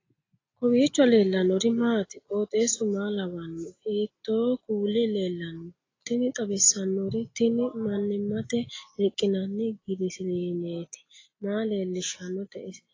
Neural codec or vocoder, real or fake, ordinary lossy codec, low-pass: none; real; AAC, 32 kbps; 7.2 kHz